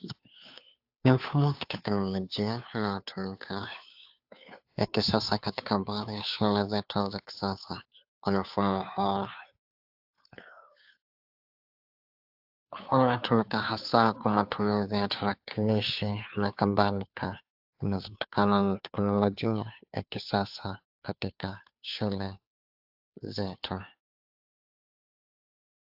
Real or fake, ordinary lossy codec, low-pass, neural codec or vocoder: fake; AAC, 48 kbps; 5.4 kHz; codec, 16 kHz, 2 kbps, FunCodec, trained on Chinese and English, 25 frames a second